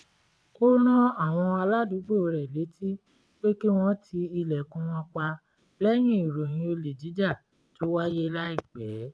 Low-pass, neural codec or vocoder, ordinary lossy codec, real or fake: none; vocoder, 22.05 kHz, 80 mel bands, WaveNeXt; none; fake